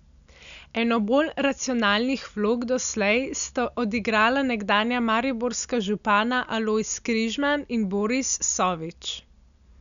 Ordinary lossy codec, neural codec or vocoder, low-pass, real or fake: none; none; 7.2 kHz; real